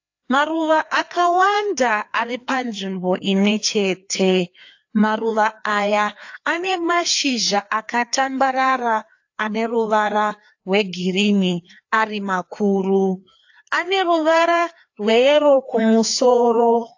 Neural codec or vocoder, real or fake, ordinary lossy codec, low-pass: codec, 16 kHz, 2 kbps, FreqCodec, larger model; fake; AAC, 48 kbps; 7.2 kHz